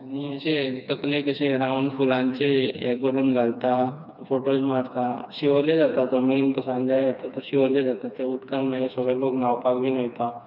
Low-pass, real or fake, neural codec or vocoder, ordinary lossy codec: 5.4 kHz; fake; codec, 16 kHz, 2 kbps, FreqCodec, smaller model; none